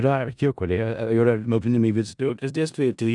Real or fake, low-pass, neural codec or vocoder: fake; 10.8 kHz; codec, 16 kHz in and 24 kHz out, 0.4 kbps, LongCat-Audio-Codec, four codebook decoder